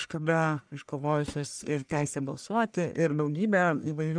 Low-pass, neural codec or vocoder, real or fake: 9.9 kHz; codec, 44.1 kHz, 1.7 kbps, Pupu-Codec; fake